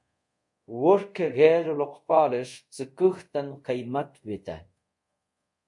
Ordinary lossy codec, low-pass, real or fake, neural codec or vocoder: MP3, 64 kbps; 10.8 kHz; fake; codec, 24 kHz, 0.5 kbps, DualCodec